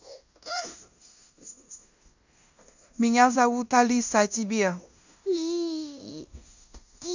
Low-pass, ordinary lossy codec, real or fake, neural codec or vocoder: 7.2 kHz; none; fake; codec, 16 kHz in and 24 kHz out, 0.9 kbps, LongCat-Audio-Codec, fine tuned four codebook decoder